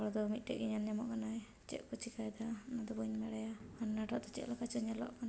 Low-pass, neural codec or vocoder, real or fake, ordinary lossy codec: none; none; real; none